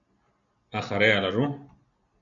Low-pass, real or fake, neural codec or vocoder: 7.2 kHz; real; none